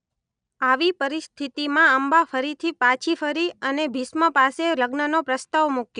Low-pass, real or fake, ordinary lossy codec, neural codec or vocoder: 9.9 kHz; real; none; none